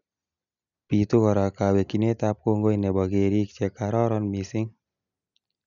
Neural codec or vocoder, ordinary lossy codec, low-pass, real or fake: none; none; 7.2 kHz; real